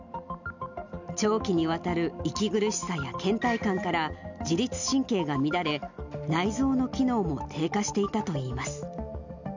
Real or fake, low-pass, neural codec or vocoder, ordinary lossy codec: real; 7.2 kHz; none; none